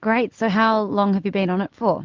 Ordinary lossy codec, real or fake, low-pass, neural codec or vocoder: Opus, 32 kbps; real; 7.2 kHz; none